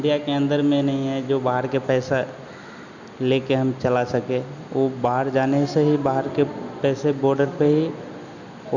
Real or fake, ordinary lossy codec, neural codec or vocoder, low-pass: real; none; none; 7.2 kHz